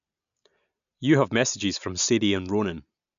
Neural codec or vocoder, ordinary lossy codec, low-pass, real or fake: none; none; 7.2 kHz; real